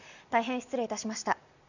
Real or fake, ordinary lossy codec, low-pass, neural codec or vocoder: real; none; 7.2 kHz; none